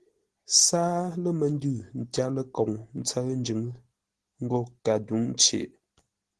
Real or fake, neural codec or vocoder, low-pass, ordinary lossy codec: real; none; 10.8 kHz; Opus, 16 kbps